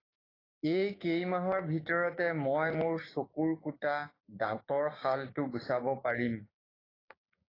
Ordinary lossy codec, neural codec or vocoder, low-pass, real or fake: AAC, 24 kbps; codec, 16 kHz, 6 kbps, DAC; 5.4 kHz; fake